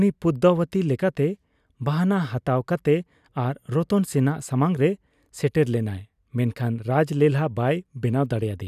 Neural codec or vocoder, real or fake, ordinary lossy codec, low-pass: none; real; none; 14.4 kHz